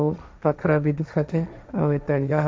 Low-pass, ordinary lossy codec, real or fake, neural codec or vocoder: none; none; fake; codec, 16 kHz, 1.1 kbps, Voila-Tokenizer